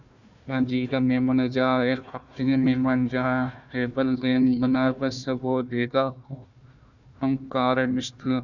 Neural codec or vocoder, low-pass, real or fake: codec, 16 kHz, 1 kbps, FunCodec, trained on Chinese and English, 50 frames a second; 7.2 kHz; fake